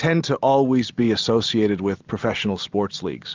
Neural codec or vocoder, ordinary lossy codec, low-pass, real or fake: none; Opus, 32 kbps; 7.2 kHz; real